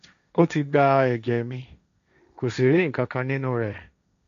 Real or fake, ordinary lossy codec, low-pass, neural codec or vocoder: fake; none; 7.2 kHz; codec, 16 kHz, 1.1 kbps, Voila-Tokenizer